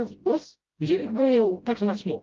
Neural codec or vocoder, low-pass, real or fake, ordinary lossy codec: codec, 16 kHz, 0.5 kbps, FreqCodec, smaller model; 7.2 kHz; fake; Opus, 32 kbps